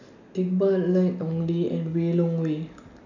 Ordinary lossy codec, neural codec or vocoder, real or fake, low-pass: none; none; real; 7.2 kHz